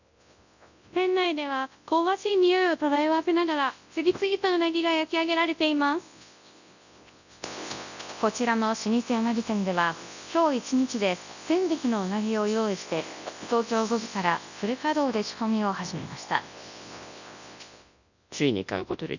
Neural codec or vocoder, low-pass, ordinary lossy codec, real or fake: codec, 24 kHz, 0.9 kbps, WavTokenizer, large speech release; 7.2 kHz; none; fake